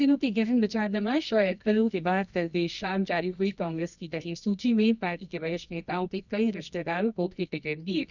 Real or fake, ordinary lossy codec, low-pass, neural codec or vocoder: fake; none; 7.2 kHz; codec, 24 kHz, 0.9 kbps, WavTokenizer, medium music audio release